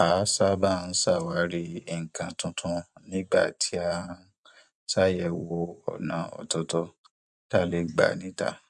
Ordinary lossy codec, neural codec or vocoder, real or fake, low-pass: none; vocoder, 24 kHz, 100 mel bands, Vocos; fake; 10.8 kHz